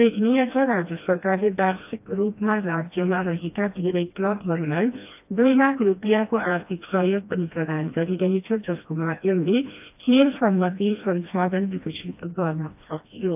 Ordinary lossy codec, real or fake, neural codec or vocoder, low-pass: AAC, 32 kbps; fake; codec, 16 kHz, 1 kbps, FreqCodec, smaller model; 3.6 kHz